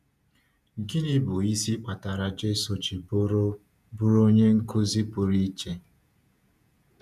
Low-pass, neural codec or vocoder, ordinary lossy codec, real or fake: 14.4 kHz; vocoder, 48 kHz, 128 mel bands, Vocos; none; fake